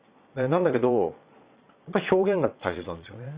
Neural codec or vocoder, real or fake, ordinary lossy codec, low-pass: vocoder, 22.05 kHz, 80 mel bands, Vocos; fake; Opus, 64 kbps; 3.6 kHz